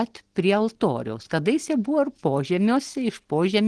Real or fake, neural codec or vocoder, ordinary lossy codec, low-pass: real; none; Opus, 16 kbps; 10.8 kHz